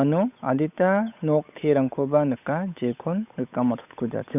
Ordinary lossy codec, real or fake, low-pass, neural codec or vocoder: none; fake; 3.6 kHz; codec, 16 kHz, 8 kbps, FunCodec, trained on Chinese and English, 25 frames a second